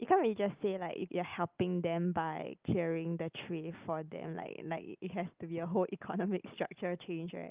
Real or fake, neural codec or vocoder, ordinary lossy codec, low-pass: real; none; Opus, 24 kbps; 3.6 kHz